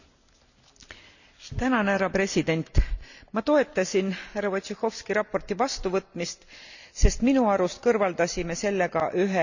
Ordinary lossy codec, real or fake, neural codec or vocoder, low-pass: none; real; none; 7.2 kHz